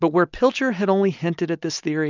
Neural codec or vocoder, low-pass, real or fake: none; 7.2 kHz; real